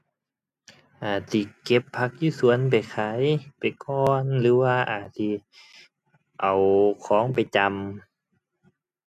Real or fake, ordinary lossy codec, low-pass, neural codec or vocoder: real; none; 14.4 kHz; none